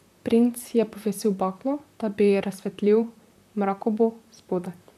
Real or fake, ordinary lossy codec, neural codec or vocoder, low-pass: fake; none; vocoder, 44.1 kHz, 128 mel bands, Pupu-Vocoder; 14.4 kHz